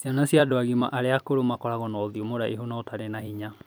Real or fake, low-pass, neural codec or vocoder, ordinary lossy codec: fake; none; vocoder, 44.1 kHz, 128 mel bands every 256 samples, BigVGAN v2; none